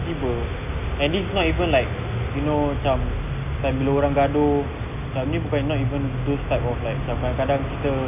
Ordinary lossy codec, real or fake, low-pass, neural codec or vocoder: none; real; 3.6 kHz; none